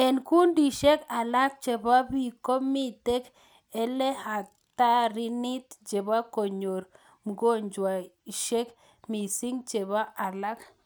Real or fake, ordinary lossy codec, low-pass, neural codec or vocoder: real; none; none; none